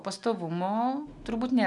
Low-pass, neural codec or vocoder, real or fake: 10.8 kHz; none; real